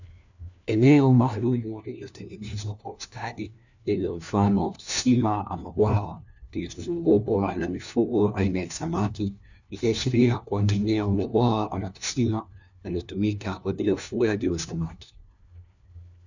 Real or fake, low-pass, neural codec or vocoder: fake; 7.2 kHz; codec, 16 kHz, 1 kbps, FunCodec, trained on LibriTTS, 50 frames a second